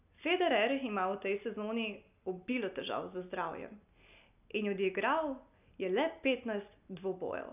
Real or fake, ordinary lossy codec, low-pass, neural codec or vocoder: real; none; 3.6 kHz; none